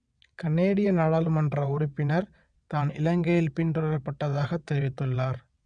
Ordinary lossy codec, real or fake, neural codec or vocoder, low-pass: none; fake; vocoder, 22.05 kHz, 80 mel bands, WaveNeXt; 9.9 kHz